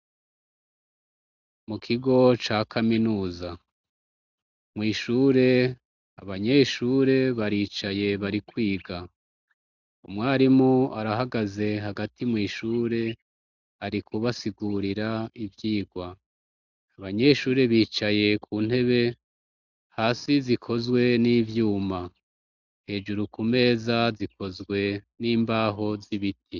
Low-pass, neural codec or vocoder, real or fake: 7.2 kHz; none; real